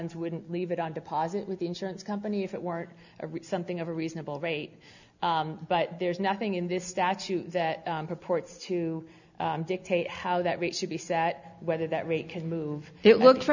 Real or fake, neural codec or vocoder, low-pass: real; none; 7.2 kHz